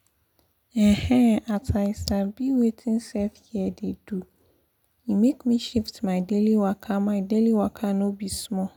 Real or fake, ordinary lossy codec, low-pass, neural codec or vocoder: real; none; 19.8 kHz; none